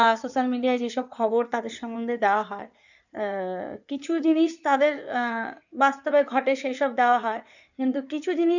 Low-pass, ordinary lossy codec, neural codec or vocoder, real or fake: 7.2 kHz; none; codec, 16 kHz in and 24 kHz out, 2.2 kbps, FireRedTTS-2 codec; fake